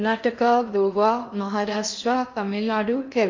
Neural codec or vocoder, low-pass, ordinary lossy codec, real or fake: codec, 16 kHz in and 24 kHz out, 0.6 kbps, FocalCodec, streaming, 4096 codes; 7.2 kHz; MP3, 48 kbps; fake